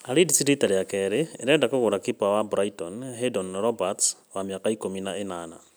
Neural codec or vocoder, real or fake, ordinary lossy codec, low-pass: none; real; none; none